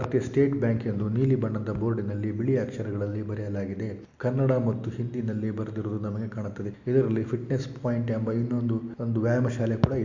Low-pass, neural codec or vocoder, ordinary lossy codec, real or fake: 7.2 kHz; none; MP3, 48 kbps; real